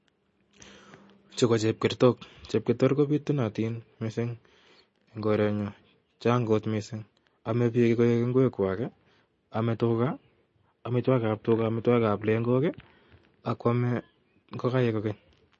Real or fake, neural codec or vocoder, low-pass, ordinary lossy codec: real; none; 10.8 kHz; MP3, 32 kbps